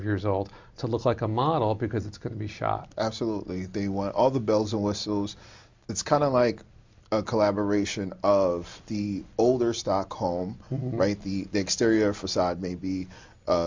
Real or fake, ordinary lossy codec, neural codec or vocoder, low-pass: real; MP3, 64 kbps; none; 7.2 kHz